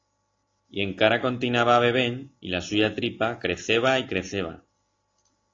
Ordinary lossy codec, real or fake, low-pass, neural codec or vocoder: AAC, 32 kbps; real; 7.2 kHz; none